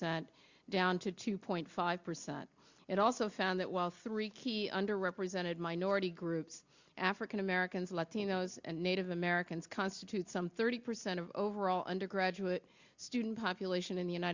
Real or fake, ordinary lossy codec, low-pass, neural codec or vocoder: real; Opus, 64 kbps; 7.2 kHz; none